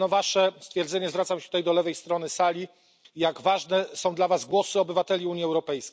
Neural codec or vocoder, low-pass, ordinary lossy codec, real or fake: none; none; none; real